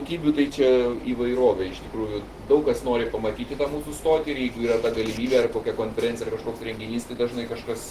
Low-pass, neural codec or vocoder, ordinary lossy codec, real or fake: 14.4 kHz; none; Opus, 16 kbps; real